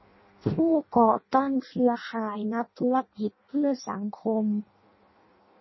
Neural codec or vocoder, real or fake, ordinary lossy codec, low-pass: codec, 16 kHz in and 24 kHz out, 0.6 kbps, FireRedTTS-2 codec; fake; MP3, 24 kbps; 7.2 kHz